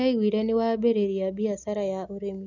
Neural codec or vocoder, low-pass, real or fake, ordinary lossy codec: none; 7.2 kHz; real; none